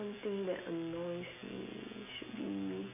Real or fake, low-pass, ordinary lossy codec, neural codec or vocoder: real; 3.6 kHz; none; none